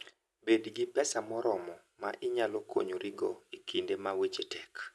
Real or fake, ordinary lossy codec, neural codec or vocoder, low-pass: real; none; none; none